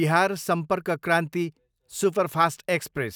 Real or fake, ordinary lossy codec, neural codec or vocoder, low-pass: real; none; none; none